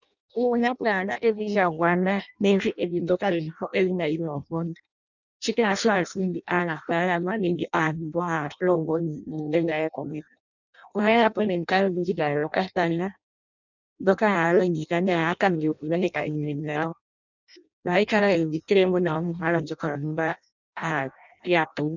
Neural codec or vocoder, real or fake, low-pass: codec, 16 kHz in and 24 kHz out, 0.6 kbps, FireRedTTS-2 codec; fake; 7.2 kHz